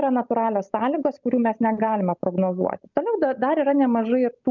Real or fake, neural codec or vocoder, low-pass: real; none; 7.2 kHz